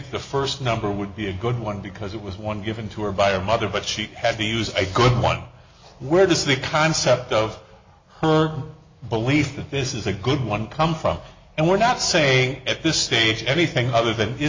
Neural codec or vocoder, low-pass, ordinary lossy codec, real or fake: none; 7.2 kHz; MP3, 32 kbps; real